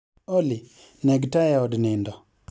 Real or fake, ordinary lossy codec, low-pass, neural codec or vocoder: real; none; none; none